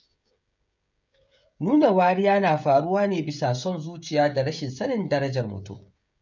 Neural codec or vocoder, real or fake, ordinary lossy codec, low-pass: codec, 16 kHz, 16 kbps, FreqCodec, smaller model; fake; none; 7.2 kHz